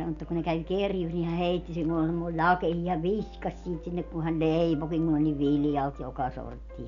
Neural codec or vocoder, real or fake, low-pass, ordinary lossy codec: none; real; 7.2 kHz; none